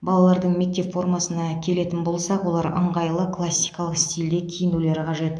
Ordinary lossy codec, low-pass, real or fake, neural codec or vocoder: none; 9.9 kHz; fake; autoencoder, 48 kHz, 128 numbers a frame, DAC-VAE, trained on Japanese speech